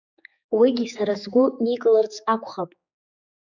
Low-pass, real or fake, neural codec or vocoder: 7.2 kHz; fake; codec, 16 kHz, 4 kbps, X-Codec, HuBERT features, trained on general audio